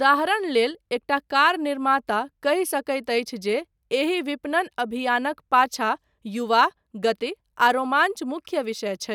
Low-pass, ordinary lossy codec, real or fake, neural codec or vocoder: 19.8 kHz; none; real; none